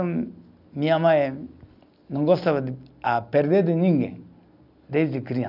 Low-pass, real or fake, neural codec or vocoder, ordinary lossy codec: 5.4 kHz; real; none; none